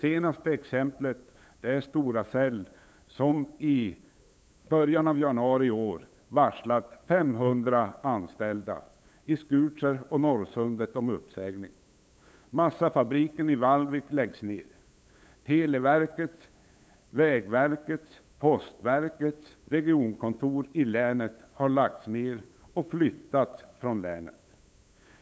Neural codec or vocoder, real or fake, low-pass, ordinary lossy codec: codec, 16 kHz, 8 kbps, FunCodec, trained on LibriTTS, 25 frames a second; fake; none; none